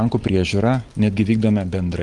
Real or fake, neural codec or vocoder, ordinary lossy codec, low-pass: real; none; Opus, 24 kbps; 10.8 kHz